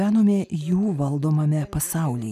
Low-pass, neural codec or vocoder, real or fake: 14.4 kHz; none; real